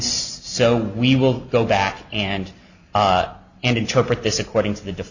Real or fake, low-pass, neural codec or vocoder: real; 7.2 kHz; none